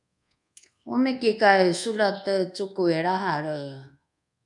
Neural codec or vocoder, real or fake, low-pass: codec, 24 kHz, 1.2 kbps, DualCodec; fake; 10.8 kHz